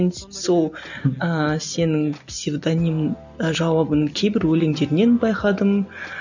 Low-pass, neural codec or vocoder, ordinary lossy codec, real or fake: 7.2 kHz; none; none; real